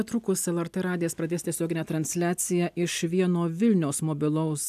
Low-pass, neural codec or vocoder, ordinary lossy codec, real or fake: 14.4 kHz; none; AAC, 96 kbps; real